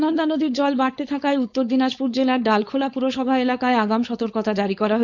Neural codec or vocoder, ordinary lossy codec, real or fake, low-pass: codec, 16 kHz, 4.8 kbps, FACodec; none; fake; 7.2 kHz